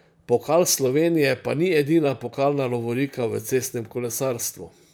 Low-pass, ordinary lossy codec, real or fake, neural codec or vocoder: none; none; fake; vocoder, 44.1 kHz, 128 mel bands, Pupu-Vocoder